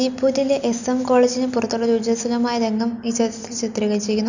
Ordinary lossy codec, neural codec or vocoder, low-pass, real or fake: none; none; 7.2 kHz; real